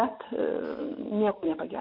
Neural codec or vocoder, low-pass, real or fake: none; 5.4 kHz; real